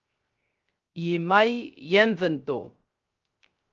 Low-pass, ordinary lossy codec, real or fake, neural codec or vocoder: 7.2 kHz; Opus, 24 kbps; fake; codec, 16 kHz, 0.3 kbps, FocalCodec